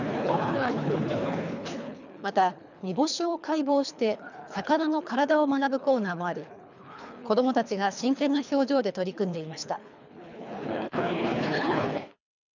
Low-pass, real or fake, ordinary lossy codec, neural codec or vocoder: 7.2 kHz; fake; none; codec, 24 kHz, 3 kbps, HILCodec